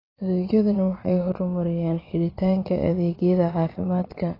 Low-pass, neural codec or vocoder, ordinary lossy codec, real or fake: 5.4 kHz; vocoder, 22.05 kHz, 80 mel bands, Vocos; AAC, 24 kbps; fake